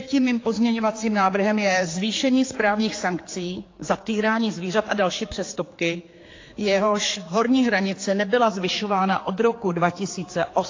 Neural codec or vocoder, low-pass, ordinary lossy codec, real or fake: codec, 16 kHz, 4 kbps, X-Codec, HuBERT features, trained on general audio; 7.2 kHz; AAC, 32 kbps; fake